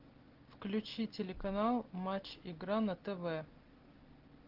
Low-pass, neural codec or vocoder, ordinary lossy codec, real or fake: 5.4 kHz; none; Opus, 16 kbps; real